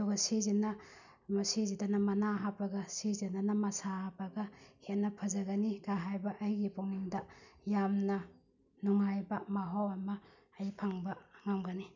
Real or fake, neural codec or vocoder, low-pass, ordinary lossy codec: real; none; 7.2 kHz; none